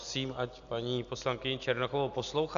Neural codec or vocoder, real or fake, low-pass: none; real; 7.2 kHz